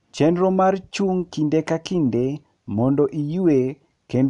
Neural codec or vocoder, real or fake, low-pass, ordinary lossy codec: none; real; 10.8 kHz; none